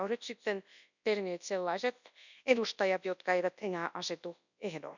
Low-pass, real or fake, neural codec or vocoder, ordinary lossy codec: 7.2 kHz; fake; codec, 24 kHz, 0.9 kbps, WavTokenizer, large speech release; none